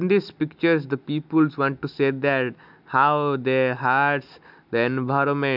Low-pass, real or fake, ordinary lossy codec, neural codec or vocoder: 5.4 kHz; real; none; none